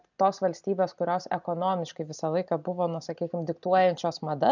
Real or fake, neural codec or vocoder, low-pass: real; none; 7.2 kHz